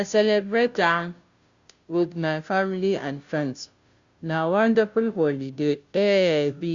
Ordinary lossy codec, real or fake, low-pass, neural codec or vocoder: Opus, 64 kbps; fake; 7.2 kHz; codec, 16 kHz, 0.5 kbps, FunCodec, trained on Chinese and English, 25 frames a second